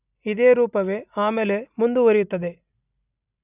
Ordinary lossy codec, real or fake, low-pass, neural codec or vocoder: none; real; 3.6 kHz; none